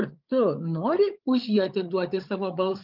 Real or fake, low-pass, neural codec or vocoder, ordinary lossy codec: fake; 5.4 kHz; codec, 16 kHz, 8 kbps, FreqCodec, larger model; Opus, 32 kbps